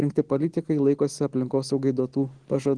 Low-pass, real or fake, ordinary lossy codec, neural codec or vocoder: 9.9 kHz; real; Opus, 16 kbps; none